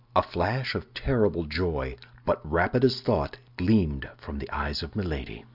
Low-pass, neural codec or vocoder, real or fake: 5.4 kHz; none; real